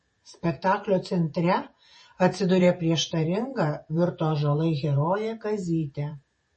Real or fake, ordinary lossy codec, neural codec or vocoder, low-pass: fake; MP3, 32 kbps; vocoder, 48 kHz, 128 mel bands, Vocos; 10.8 kHz